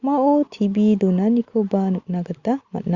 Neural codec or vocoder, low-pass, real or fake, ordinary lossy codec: none; 7.2 kHz; real; Opus, 64 kbps